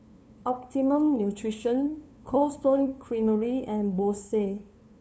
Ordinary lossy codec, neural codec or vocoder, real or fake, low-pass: none; codec, 16 kHz, 2 kbps, FunCodec, trained on LibriTTS, 25 frames a second; fake; none